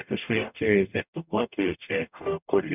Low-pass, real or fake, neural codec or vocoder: 3.6 kHz; fake; codec, 44.1 kHz, 0.9 kbps, DAC